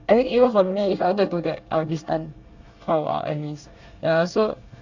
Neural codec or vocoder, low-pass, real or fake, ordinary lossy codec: codec, 24 kHz, 1 kbps, SNAC; 7.2 kHz; fake; Opus, 64 kbps